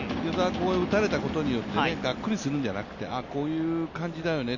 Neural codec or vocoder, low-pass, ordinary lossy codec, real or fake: none; 7.2 kHz; none; real